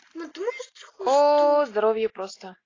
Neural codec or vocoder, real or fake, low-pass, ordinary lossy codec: none; real; 7.2 kHz; AAC, 32 kbps